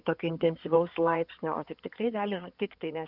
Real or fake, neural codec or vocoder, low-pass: fake; codec, 16 kHz in and 24 kHz out, 2.2 kbps, FireRedTTS-2 codec; 5.4 kHz